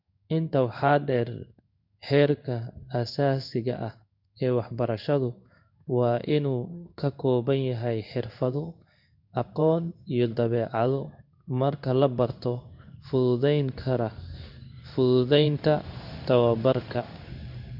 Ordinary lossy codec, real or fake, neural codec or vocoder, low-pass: none; fake; codec, 16 kHz in and 24 kHz out, 1 kbps, XY-Tokenizer; 5.4 kHz